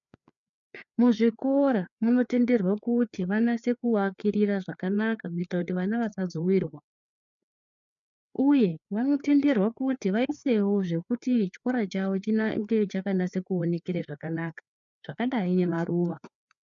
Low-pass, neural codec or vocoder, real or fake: 7.2 kHz; codec, 16 kHz, 4 kbps, FreqCodec, larger model; fake